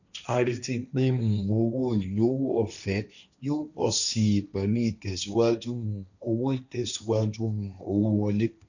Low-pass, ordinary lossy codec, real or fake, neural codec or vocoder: 7.2 kHz; none; fake; codec, 16 kHz, 1.1 kbps, Voila-Tokenizer